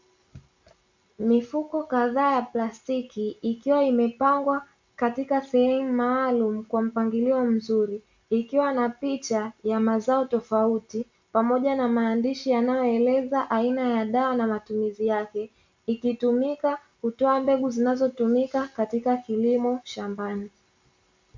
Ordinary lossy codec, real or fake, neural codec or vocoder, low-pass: MP3, 64 kbps; real; none; 7.2 kHz